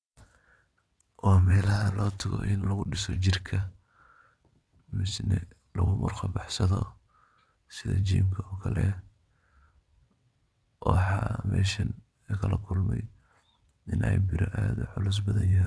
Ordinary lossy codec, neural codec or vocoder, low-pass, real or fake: none; vocoder, 22.05 kHz, 80 mel bands, WaveNeXt; none; fake